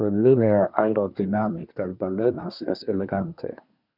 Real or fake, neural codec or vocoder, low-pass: fake; codec, 24 kHz, 1 kbps, SNAC; 5.4 kHz